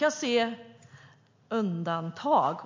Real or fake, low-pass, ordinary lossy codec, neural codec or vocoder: real; 7.2 kHz; MP3, 48 kbps; none